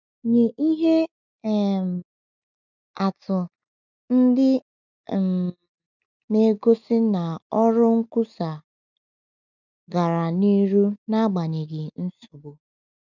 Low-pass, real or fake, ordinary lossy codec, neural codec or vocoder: 7.2 kHz; real; none; none